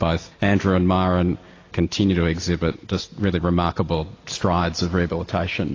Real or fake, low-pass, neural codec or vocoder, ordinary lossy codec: real; 7.2 kHz; none; AAC, 32 kbps